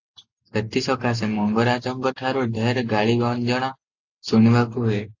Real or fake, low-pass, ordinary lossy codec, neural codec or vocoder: real; 7.2 kHz; AAC, 48 kbps; none